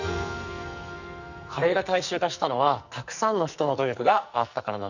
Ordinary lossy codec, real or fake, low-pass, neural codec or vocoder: none; fake; 7.2 kHz; codec, 44.1 kHz, 2.6 kbps, SNAC